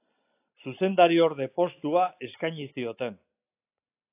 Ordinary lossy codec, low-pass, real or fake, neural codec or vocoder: AAC, 24 kbps; 3.6 kHz; real; none